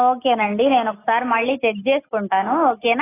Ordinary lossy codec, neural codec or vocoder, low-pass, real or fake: AAC, 16 kbps; none; 3.6 kHz; real